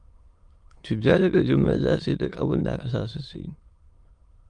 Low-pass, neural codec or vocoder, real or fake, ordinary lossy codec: 9.9 kHz; autoencoder, 22.05 kHz, a latent of 192 numbers a frame, VITS, trained on many speakers; fake; Opus, 32 kbps